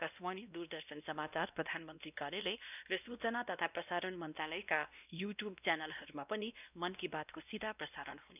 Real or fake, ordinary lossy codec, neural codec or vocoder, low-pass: fake; none; codec, 16 kHz, 1 kbps, X-Codec, WavLM features, trained on Multilingual LibriSpeech; 3.6 kHz